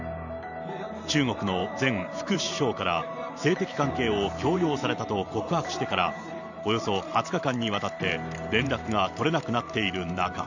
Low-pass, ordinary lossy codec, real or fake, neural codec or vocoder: 7.2 kHz; none; fake; vocoder, 44.1 kHz, 128 mel bands every 512 samples, BigVGAN v2